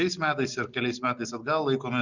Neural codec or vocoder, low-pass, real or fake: none; 7.2 kHz; real